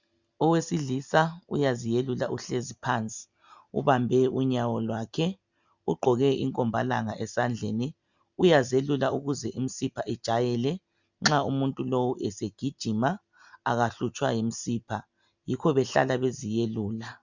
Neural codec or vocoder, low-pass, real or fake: none; 7.2 kHz; real